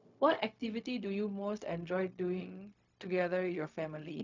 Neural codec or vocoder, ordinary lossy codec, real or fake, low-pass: codec, 16 kHz, 0.4 kbps, LongCat-Audio-Codec; none; fake; 7.2 kHz